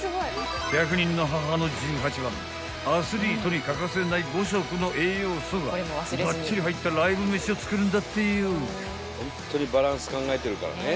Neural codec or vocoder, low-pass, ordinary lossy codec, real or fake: none; none; none; real